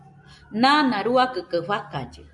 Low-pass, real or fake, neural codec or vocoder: 10.8 kHz; real; none